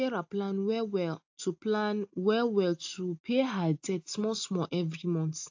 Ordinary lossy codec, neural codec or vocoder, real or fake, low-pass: AAC, 48 kbps; none; real; 7.2 kHz